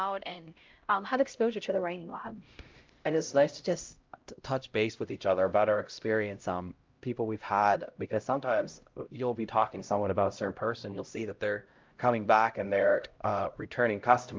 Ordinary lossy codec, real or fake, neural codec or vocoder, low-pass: Opus, 24 kbps; fake; codec, 16 kHz, 0.5 kbps, X-Codec, HuBERT features, trained on LibriSpeech; 7.2 kHz